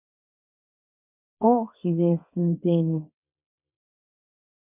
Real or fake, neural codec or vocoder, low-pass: fake; codec, 24 kHz, 0.9 kbps, WavTokenizer, small release; 3.6 kHz